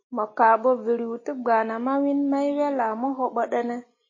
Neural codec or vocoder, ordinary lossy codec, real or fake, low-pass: none; MP3, 32 kbps; real; 7.2 kHz